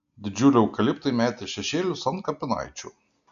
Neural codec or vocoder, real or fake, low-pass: none; real; 7.2 kHz